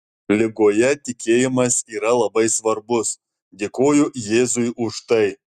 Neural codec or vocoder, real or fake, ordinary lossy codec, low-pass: none; real; Opus, 64 kbps; 14.4 kHz